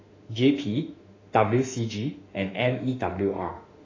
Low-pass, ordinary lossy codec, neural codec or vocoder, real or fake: 7.2 kHz; AAC, 32 kbps; autoencoder, 48 kHz, 32 numbers a frame, DAC-VAE, trained on Japanese speech; fake